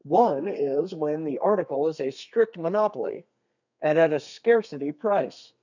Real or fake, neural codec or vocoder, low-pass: fake; codec, 32 kHz, 1.9 kbps, SNAC; 7.2 kHz